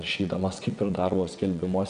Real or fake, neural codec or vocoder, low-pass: fake; vocoder, 22.05 kHz, 80 mel bands, WaveNeXt; 9.9 kHz